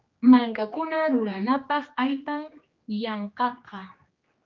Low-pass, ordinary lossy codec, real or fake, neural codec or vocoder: 7.2 kHz; Opus, 24 kbps; fake; codec, 16 kHz, 2 kbps, X-Codec, HuBERT features, trained on general audio